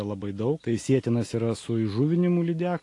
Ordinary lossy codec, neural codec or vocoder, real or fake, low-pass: AAC, 48 kbps; none; real; 10.8 kHz